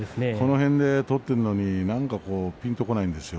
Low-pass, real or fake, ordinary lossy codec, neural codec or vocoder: none; real; none; none